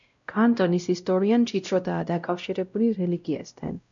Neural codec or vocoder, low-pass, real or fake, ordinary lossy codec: codec, 16 kHz, 0.5 kbps, X-Codec, WavLM features, trained on Multilingual LibriSpeech; 7.2 kHz; fake; MP3, 64 kbps